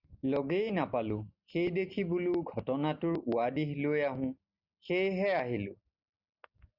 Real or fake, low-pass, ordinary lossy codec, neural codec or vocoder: real; 5.4 kHz; Opus, 64 kbps; none